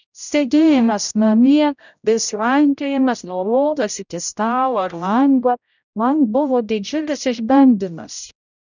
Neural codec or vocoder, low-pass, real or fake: codec, 16 kHz, 0.5 kbps, X-Codec, HuBERT features, trained on general audio; 7.2 kHz; fake